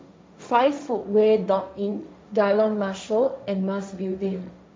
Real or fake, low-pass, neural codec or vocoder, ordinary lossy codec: fake; none; codec, 16 kHz, 1.1 kbps, Voila-Tokenizer; none